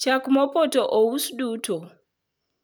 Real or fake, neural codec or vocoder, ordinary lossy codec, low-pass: real; none; none; none